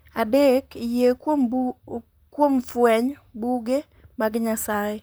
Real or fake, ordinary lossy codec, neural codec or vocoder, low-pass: fake; none; vocoder, 44.1 kHz, 128 mel bands, Pupu-Vocoder; none